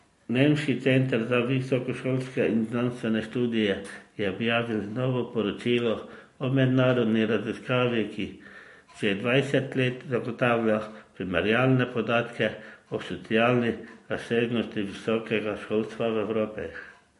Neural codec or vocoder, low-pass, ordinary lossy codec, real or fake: none; 14.4 kHz; MP3, 48 kbps; real